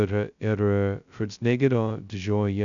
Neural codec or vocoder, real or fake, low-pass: codec, 16 kHz, 0.2 kbps, FocalCodec; fake; 7.2 kHz